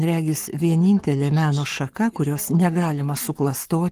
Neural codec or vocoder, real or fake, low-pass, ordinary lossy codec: codec, 44.1 kHz, 7.8 kbps, Pupu-Codec; fake; 14.4 kHz; Opus, 24 kbps